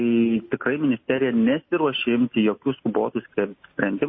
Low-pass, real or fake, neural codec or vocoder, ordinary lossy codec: 7.2 kHz; real; none; MP3, 24 kbps